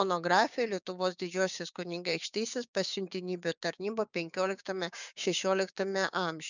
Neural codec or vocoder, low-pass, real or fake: codec, 16 kHz, 6 kbps, DAC; 7.2 kHz; fake